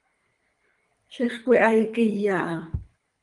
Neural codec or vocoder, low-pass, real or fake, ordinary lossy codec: codec, 24 kHz, 3 kbps, HILCodec; 10.8 kHz; fake; Opus, 32 kbps